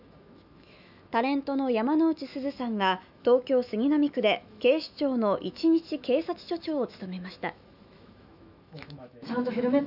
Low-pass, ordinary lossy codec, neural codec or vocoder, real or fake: 5.4 kHz; none; autoencoder, 48 kHz, 128 numbers a frame, DAC-VAE, trained on Japanese speech; fake